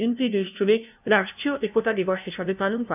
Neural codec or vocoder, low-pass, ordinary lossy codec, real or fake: codec, 16 kHz, 0.5 kbps, FunCodec, trained on LibriTTS, 25 frames a second; 3.6 kHz; none; fake